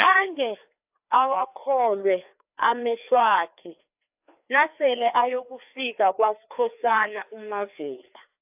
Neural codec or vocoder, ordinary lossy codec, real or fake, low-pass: codec, 16 kHz, 2 kbps, FreqCodec, larger model; AAC, 32 kbps; fake; 3.6 kHz